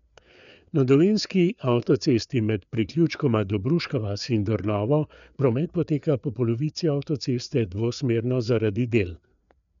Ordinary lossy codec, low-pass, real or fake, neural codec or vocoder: none; 7.2 kHz; fake; codec, 16 kHz, 4 kbps, FreqCodec, larger model